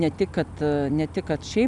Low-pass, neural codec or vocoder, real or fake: 10.8 kHz; none; real